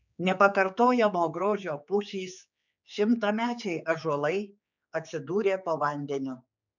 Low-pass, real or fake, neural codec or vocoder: 7.2 kHz; fake; codec, 16 kHz, 4 kbps, X-Codec, HuBERT features, trained on general audio